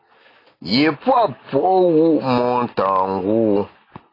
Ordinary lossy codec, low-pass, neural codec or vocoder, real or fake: AAC, 24 kbps; 5.4 kHz; none; real